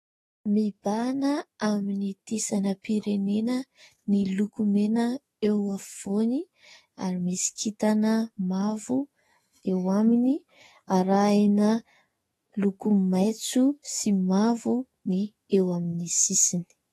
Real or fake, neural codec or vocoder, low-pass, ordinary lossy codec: fake; autoencoder, 48 kHz, 128 numbers a frame, DAC-VAE, trained on Japanese speech; 19.8 kHz; AAC, 32 kbps